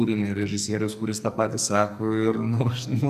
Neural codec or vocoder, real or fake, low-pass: codec, 44.1 kHz, 2.6 kbps, SNAC; fake; 14.4 kHz